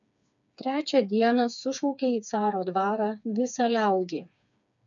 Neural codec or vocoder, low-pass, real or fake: codec, 16 kHz, 4 kbps, FreqCodec, smaller model; 7.2 kHz; fake